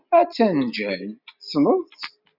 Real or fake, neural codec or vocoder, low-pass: real; none; 5.4 kHz